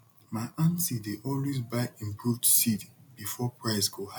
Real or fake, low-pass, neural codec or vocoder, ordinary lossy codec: real; none; none; none